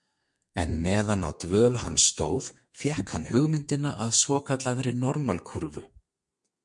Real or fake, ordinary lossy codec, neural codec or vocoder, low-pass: fake; MP3, 64 kbps; codec, 32 kHz, 1.9 kbps, SNAC; 10.8 kHz